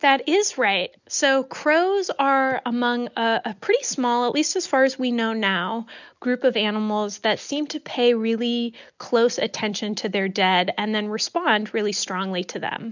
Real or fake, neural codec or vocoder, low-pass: real; none; 7.2 kHz